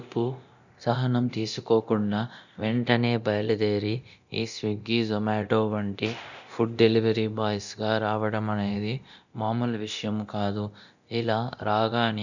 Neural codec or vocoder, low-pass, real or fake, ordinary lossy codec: codec, 24 kHz, 0.9 kbps, DualCodec; 7.2 kHz; fake; none